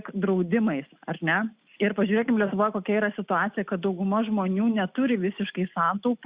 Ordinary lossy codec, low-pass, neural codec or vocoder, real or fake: Opus, 64 kbps; 3.6 kHz; none; real